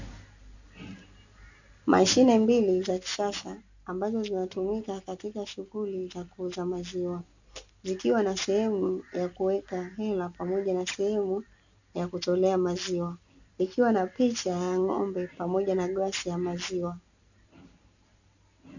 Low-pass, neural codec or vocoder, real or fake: 7.2 kHz; none; real